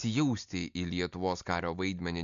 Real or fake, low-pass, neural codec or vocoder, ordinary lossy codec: real; 7.2 kHz; none; MP3, 64 kbps